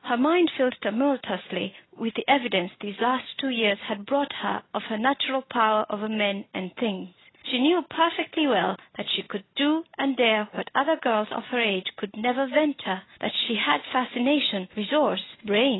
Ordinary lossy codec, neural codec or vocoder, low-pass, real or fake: AAC, 16 kbps; none; 7.2 kHz; real